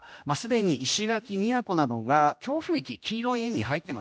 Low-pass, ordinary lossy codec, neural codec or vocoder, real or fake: none; none; codec, 16 kHz, 1 kbps, X-Codec, HuBERT features, trained on general audio; fake